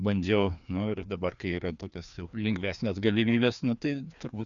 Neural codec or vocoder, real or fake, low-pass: codec, 16 kHz, 2 kbps, FreqCodec, larger model; fake; 7.2 kHz